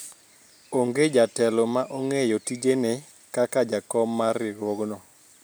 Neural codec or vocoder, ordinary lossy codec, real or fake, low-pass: none; none; real; none